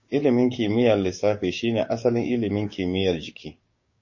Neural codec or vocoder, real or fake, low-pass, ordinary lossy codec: codec, 16 kHz, 6 kbps, DAC; fake; 7.2 kHz; MP3, 32 kbps